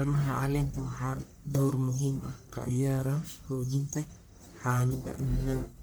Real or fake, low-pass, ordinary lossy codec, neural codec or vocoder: fake; none; none; codec, 44.1 kHz, 1.7 kbps, Pupu-Codec